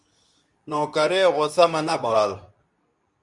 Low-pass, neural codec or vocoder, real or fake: 10.8 kHz; codec, 24 kHz, 0.9 kbps, WavTokenizer, medium speech release version 2; fake